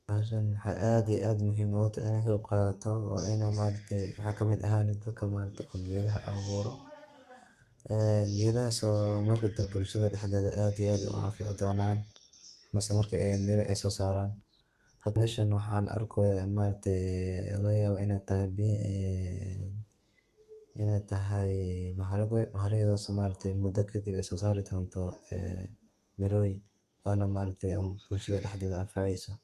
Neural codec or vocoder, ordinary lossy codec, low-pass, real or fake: codec, 32 kHz, 1.9 kbps, SNAC; Opus, 64 kbps; 14.4 kHz; fake